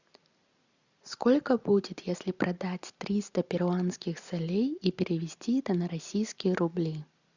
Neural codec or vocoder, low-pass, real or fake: none; 7.2 kHz; real